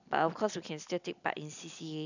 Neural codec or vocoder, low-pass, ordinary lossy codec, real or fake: none; 7.2 kHz; none; real